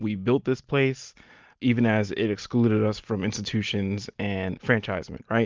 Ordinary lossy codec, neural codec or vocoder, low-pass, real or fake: Opus, 32 kbps; none; 7.2 kHz; real